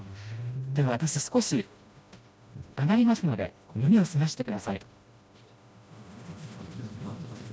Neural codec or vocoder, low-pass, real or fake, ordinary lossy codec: codec, 16 kHz, 1 kbps, FreqCodec, smaller model; none; fake; none